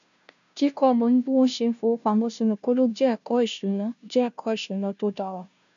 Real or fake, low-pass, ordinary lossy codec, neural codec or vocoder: fake; 7.2 kHz; none; codec, 16 kHz, 0.5 kbps, FunCodec, trained on Chinese and English, 25 frames a second